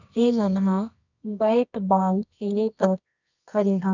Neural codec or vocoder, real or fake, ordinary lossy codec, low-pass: codec, 24 kHz, 0.9 kbps, WavTokenizer, medium music audio release; fake; none; 7.2 kHz